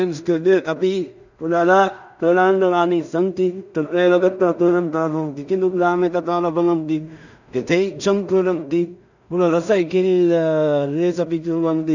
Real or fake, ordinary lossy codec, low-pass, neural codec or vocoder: fake; none; 7.2 kHz; codec, 16 kHz in and 24 kHz out, 0.4 kbps, LongCat-Audio-Codec, two codebook decoder